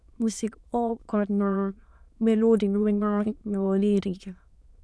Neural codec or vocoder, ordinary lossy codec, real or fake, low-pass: autoencoder, 22.05 kHz, a latent of 192 numbers a frame, VITS, trained on many speakers; none; fake; none